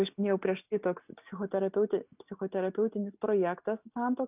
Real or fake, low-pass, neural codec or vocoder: real; 3.6 kHz; none